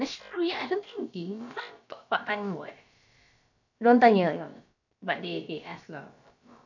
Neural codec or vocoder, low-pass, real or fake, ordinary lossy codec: codec, 16 kHz, about 1 kbps, DyCAST, with the encoder's durations; 7.2 kHz; fake; none